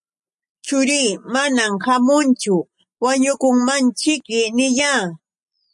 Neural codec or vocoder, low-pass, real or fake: none; 10.8 kHz; real